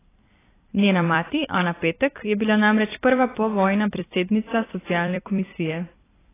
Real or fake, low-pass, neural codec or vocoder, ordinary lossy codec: fake; 3.6 kHz; vocoder, 44.1 kHz, 128 mel bands every 256 samples, BigVGAN v2; AAC, 16 kbps